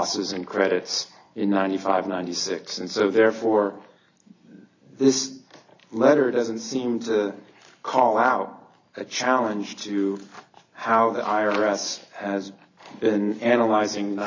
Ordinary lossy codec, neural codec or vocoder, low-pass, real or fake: AAC, 48 kbps; none; 7.2 kHz; real